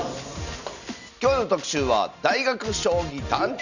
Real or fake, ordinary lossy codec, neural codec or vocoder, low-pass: real; none; none; 7.2 kHz